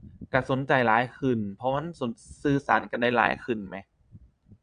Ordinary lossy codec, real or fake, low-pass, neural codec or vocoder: AAC, 96 kbps; fake; 9.9 kHz; vocoder, 22.05 kHz, 80 mel bands, Vocos